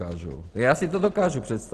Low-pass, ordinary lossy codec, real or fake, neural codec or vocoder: 10.8 kHz; Opus, 16 kbps; real; none